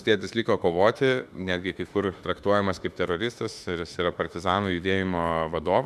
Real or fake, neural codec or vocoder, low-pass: fake; autoencoder, 48 kHz, 32 numbers a frame, DAC-VAE, trained on Japanese speech; 14.4 kHz